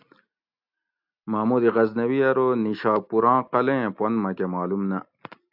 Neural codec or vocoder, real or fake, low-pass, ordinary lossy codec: none; real; 5.4 kHz; AAC, 48 kbps